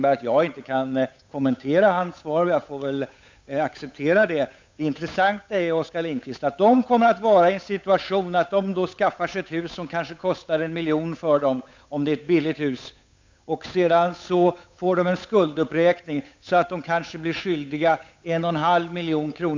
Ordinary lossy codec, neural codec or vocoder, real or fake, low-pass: MP3, 64 kbps; codec, 16 kHz, 8 kbps, FunCodec, trained on Chinese and English, 25 frames a second; fake; 7.2 kHz